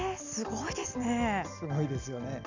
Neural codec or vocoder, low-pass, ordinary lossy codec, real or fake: none; 7.2 kHz; none; real